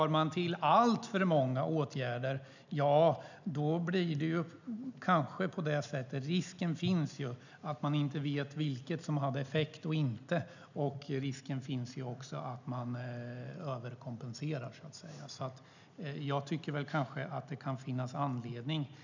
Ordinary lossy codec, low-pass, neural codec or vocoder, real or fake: none; 7.2 kHz; none; real